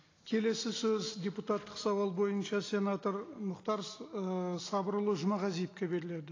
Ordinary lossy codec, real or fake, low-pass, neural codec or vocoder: AAC, 32 kbps; real; 7.2 kHz; none